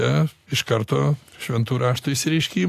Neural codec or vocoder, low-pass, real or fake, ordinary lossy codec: none; 14.4 kHz; real; AAC, 64 kbps